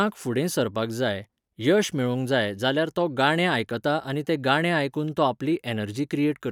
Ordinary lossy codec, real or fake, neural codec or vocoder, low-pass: none; real; none; 19.8 kHz